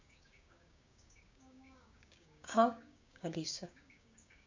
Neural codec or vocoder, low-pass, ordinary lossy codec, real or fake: codec, 16 kHz, 6 kbps, DAC; 7.2 kHz; MP3, 64 kbps; fake